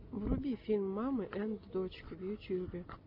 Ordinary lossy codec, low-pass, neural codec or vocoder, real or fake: AAC, 48 kbps; 5.4 kHz; none; real